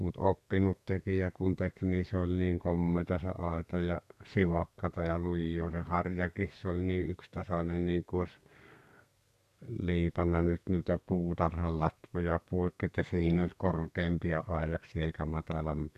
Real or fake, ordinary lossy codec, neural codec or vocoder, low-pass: fake; none; codec, 32 kHz, 1.9 kbps, SNAC; 14.4 kHz